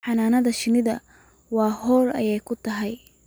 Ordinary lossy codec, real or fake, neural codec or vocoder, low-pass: none; real; none; none